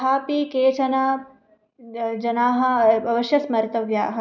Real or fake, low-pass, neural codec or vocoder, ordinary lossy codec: real; 7.2 kHz; none; none